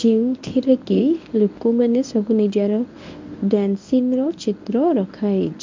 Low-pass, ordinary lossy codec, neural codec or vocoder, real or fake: 7.2 kHz; MP3, 64 kbps; codec, 24 kHz, 0.9 kbps, WavTokenizer, medium speech release version 1; fake